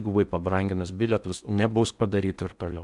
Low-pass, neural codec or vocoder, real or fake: 10.8 kHz; codec, 16 kHz in and 24 kHz out, 0.8 kbps, FocalCodec, streaming, 65536 codes; fake